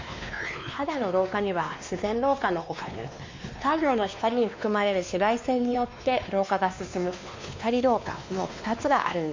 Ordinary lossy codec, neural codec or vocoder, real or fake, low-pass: MP3, 48 kbps; codec, 16 kHz, 2 kbps, X-Codec, WavLM features, trained on Multilingual LibriSpeech; fake; 7.2 kHz